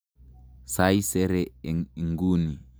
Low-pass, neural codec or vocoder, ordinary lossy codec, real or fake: none; none; none; real